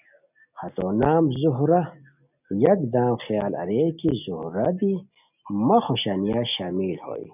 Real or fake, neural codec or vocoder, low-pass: real; none; 3.6 kHz